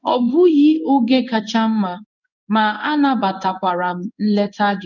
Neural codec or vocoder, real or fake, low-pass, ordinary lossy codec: codec, 16 kHz in and 24 kHz out, 1 kbps, XY-Tokenizer; fake; 7.2 kHz; none